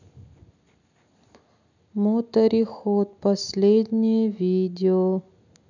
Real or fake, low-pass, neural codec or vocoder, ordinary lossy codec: real; 7.2 kHz; none; none